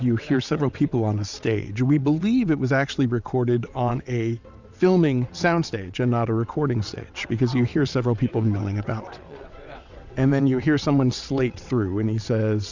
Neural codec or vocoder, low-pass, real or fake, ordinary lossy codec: vocoder, 22.05 kHz, 80 mel bands, WaveNeXt; 7.2 kHz; fake; Opus, 64 kbps